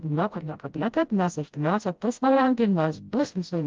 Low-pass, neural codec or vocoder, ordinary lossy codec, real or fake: 7.2 kHz; codec, 16 kHz, 0.5 kbps, FreqCodec, smaller model; Opus, 32 kbps; fake